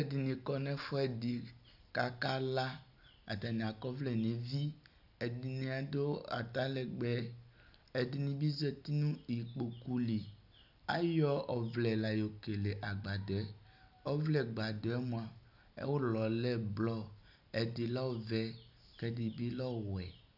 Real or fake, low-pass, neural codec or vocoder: real; 5.4 kHz; none